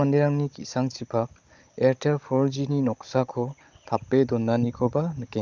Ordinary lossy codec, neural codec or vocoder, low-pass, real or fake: Opus, 24 kbps; codec, 16 kHz, 16 kbps, FunCodec, trained on Chinese and English, 50 frames a second; 7.2 kHz; fake